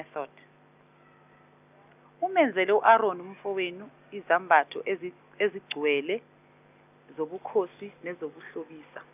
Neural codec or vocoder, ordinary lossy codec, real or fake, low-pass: none; none; real; 3.6 kHz